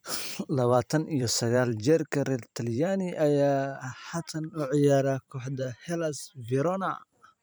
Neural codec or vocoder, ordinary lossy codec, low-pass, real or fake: vocoder, 44.1 kHz, 128 mel bands every 512 samples, BigVGAN v2; none; none; fake